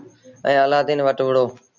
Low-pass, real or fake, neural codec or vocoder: 7.2 kHz; real; none